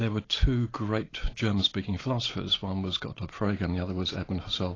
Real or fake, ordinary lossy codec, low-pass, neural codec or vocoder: real; AAC, 32 kbps; 7.2 kHz; none